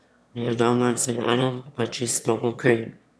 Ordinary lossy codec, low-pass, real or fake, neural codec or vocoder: none; none; fake; autoencoder, 22.05 kHz, a latent of 192 numbers a frame, VITS, trained on one speaker